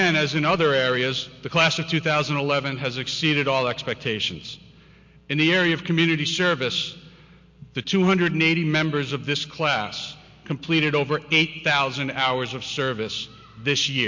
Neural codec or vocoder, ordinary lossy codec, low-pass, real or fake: none; MP3, 48 kbps; 7.2 kHz; real